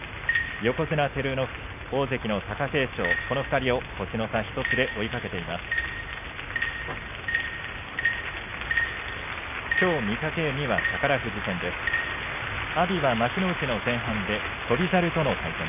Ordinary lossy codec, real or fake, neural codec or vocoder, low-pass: none; real; none; 3.6 kHz